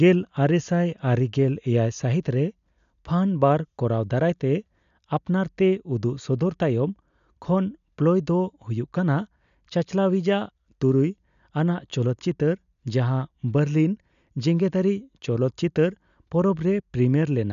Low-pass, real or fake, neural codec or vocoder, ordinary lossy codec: 7.2 kHz; real; none; none